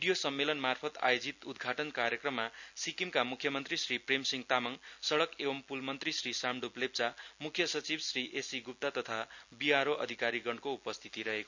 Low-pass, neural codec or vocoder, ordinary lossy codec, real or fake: 7.2 kHz; none; none; real